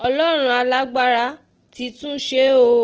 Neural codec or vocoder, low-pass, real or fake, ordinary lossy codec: none; 7.2 kHz; real; Opus, 16 kbps